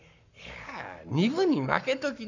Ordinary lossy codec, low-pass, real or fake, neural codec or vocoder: none; 7.2 kHz; fake; codec, 44.1 kHz, 7.8 kbps, Pupu-Codec